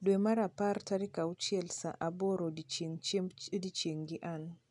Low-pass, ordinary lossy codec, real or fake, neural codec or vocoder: 10.8 kHz; none; real; none